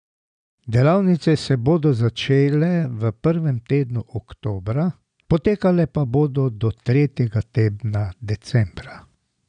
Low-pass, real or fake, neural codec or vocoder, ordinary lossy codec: 9.9 kHz; real; none; none